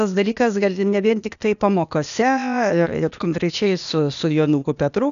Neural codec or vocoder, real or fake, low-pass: codec, 16 kHz, 0.8 kbps, ZipCodec; fake; 7.2 kHz